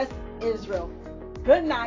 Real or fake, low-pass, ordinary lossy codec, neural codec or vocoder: real; 7.2 kHz; AAC, 32 kbps; none